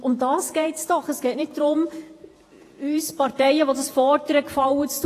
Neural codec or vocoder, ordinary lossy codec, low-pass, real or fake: vocoder, 48 kHz, 128 mel bands, Vocos; AAC, 48 kbps; 14.4 kHz; fake